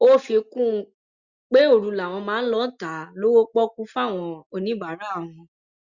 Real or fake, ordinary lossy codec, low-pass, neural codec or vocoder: real; Opus, 64 kbps; 7.2 kHz; none